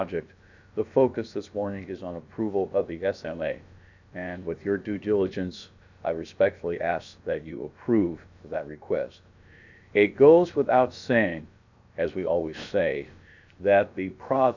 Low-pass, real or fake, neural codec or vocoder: 7.2 kHz; fake; codec, 16 kHz, about 1 kbps, DyCAST, with the encoder's durations